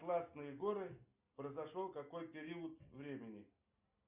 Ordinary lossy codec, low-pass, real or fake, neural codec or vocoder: Opus, 64 kbps; 3.6 kHz; real; none